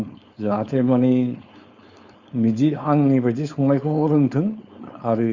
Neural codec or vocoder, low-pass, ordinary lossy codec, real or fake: codec, 16 kHz, 4.8 kbps, FACodec; 7.2 kHz; Opus, 64 kbps; fake